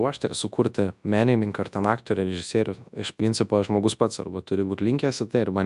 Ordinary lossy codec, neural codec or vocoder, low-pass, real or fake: AAC, 96 kbps; codec, 24 kHz, 0.9 kbps, WavTokenizer, large speech release; 10.8 kHz; fake